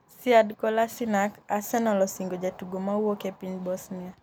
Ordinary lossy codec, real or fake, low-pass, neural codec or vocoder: none; real; none; none